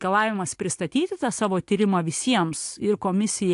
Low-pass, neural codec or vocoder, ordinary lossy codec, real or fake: 10.8 kHz; none; AAC, 64 kbps; real